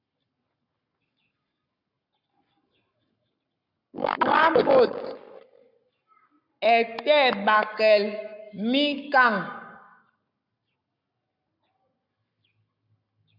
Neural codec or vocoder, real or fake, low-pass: codec, 44.1 kHz, 7.8 kbps, Pupu-Codec; fake; 5.4 kHz